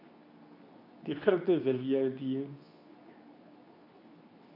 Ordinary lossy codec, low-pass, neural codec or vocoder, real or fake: none; 5.4 kHz; codec, 24 kHz, 0.9 kbps, WavTokenizer, medium speech release version 2; fake